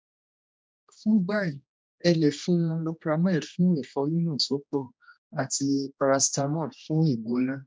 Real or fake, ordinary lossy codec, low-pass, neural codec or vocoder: fake; none; none; codec, 16 kHz, 1 kbps, X-Codec, HuBERT features, trained on general audio